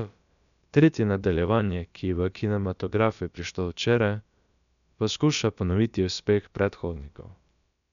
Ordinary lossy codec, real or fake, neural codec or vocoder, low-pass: none; fake; codec, 16 kHz, about 1 kbps, DyCAST, with the encoder's durations; 7.2 kHz